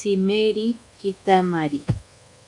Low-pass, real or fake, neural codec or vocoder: 10.8 kHz; fake; codec, 24 kHz, 1.2 kbps, DualCodec